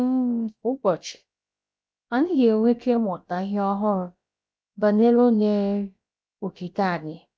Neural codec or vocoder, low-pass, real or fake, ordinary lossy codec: codec, 16 kHz, about 1 kbps, DyCAST, with the encoder's durations; none; fake; none